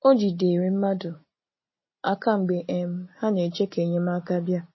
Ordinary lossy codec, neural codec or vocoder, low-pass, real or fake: MP3, 24 kbps; none; 7.2 kHz; real